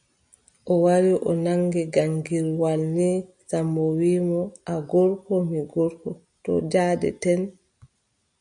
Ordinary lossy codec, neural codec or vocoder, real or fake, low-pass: MP3, 96 kbps; none; real; 9.9 kHz